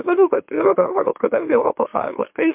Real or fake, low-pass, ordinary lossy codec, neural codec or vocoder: fake; 3.6 kHz; MP3, 32 kbps; autoencoder, 44.1 kHz, a latent of 192 numbers a frame, MeloTTS